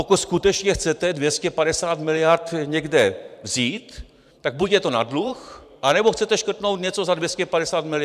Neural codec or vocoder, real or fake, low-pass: vocoder, 44.1 kHz, 128 mel bands every 512 samples, BigVGAN v2; fake; 14.4 kHz